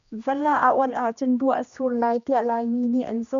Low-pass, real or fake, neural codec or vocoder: 7.2 kHz; fake; codec, 16 kHz, 1 kbps, X-Codec, HuBERT features, trained on general audio